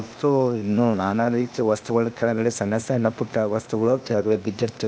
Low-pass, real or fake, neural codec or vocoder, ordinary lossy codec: none; fake; codec, 16 kHz, 0.8 kbps, ZipCodec; none